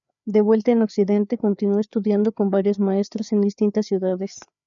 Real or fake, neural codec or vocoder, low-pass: fake; codec, 16 kHz, 4 kbps, FreqCodec, larger model; 7.2 kHz